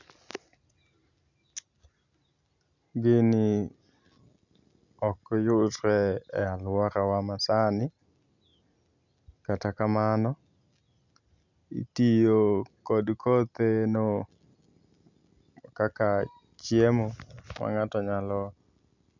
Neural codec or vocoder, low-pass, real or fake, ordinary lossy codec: none; 7.2 kHz; real; none